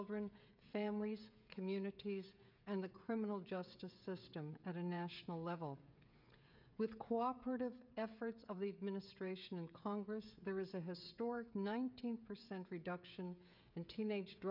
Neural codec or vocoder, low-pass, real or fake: codec, 16 kHz, 16 kbps, FreqCodec, smaller model; 5.4 kHz; fake